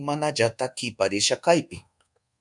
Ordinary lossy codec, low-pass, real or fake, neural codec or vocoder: MP3, 96 kbps; 10.8 kHz; fake; codec, 24 kHz, 1.2 kbps, DualCodec